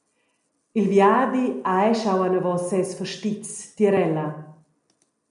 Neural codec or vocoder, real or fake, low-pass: none; real; 10.8 kHz